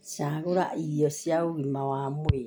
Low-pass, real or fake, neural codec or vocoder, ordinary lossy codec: none; real; none; none